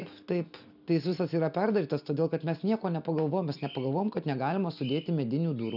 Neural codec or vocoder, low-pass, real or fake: none; 5.4 kHz; real